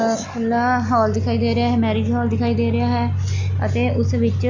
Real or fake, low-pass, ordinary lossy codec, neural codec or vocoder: real; 7.2 kHz; none; none